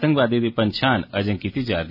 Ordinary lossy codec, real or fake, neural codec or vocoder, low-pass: none; real; none; 5.4 kHz